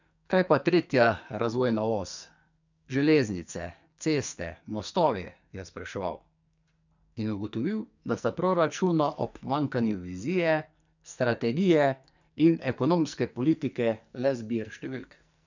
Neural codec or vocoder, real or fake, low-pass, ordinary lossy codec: codec, 44.1 kHz, 2.6 kbps, SNAC; fake; 7.2 kHz; none